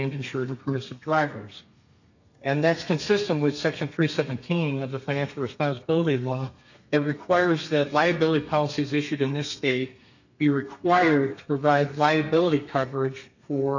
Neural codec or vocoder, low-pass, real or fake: codec, 32 kHz, 1.9 kbps, SNAC; 7.2 kHz; fake